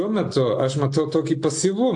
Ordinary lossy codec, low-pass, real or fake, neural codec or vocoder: AAC, 64 kbps; 10.8 kHz; real; none